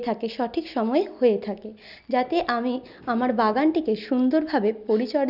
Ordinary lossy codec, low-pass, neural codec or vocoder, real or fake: none; 5.4 kHz; none; real